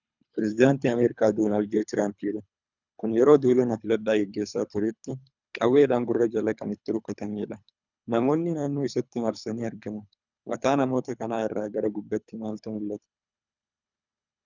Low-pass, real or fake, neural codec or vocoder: 7.2 kHz; fake; codec, 24 kHz, 3 kbps, HILCodec